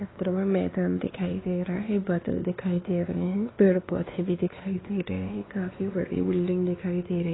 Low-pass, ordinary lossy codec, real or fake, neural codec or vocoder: 7.2 kHz; AAC, 16 kbps; fake; codec, 16 kHz, 2 kbps, X-Codec, WavLM features, trained on Multilingual LibriSpeech